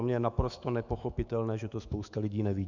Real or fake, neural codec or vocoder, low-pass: fake; autoencoder, 48 kHz, 128 numbers a frame, DAC-VAE, trained on Japanese speech; 7.2 kHz